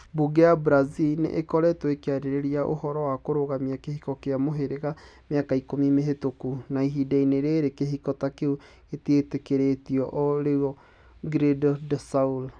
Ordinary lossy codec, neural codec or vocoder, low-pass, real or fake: none; none; 9.9 kHz; real